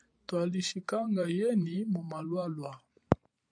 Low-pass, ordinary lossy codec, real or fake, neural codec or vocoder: 9.9 kHz; AAC, 64 kbps; fake; vocoder, 44.1 kHz, 128 mel bands every 512 samples, BigVGAN v2